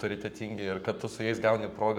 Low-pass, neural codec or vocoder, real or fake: 19.8 kHz; codec, 44.1 kHz, 7.8 kbps, Pupu-Codec; fake